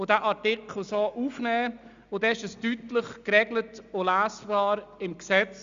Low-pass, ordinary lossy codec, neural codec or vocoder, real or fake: 7.2 kHz; Opus, 64 kbps; none; real